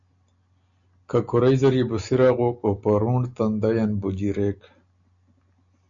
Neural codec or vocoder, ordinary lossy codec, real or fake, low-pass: none; MP3, 64 kbps; real; 7.2 kHz